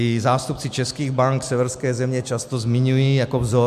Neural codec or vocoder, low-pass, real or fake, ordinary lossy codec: none; 14.4 kHz; real; MP3, 96 kbps